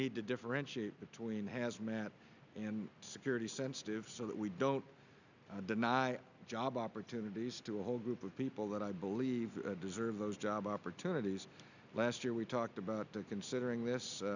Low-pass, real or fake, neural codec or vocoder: 7.2 kHz; real; none